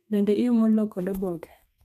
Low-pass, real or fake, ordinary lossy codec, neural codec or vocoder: 14.4 kHz; fake; none; codec, 32 kHz, 1.9 kbps, SNAC